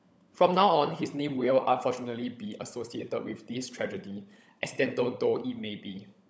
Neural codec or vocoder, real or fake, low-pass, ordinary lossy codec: codec, 16 kHz, 16 kbps, FunCodec, trained on LibriTTS, 50 frames a second; fake; none; none